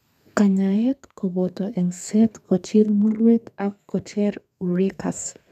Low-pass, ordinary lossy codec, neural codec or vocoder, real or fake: 14.4 kHz; none; codec, 32 kHz, 1.9 kbps, SNAC; fake